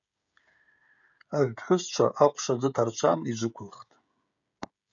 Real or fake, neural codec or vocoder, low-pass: fake; codec, 16 kHz, 16 kbps, FreqCodec, smaller model; 7.2 kHz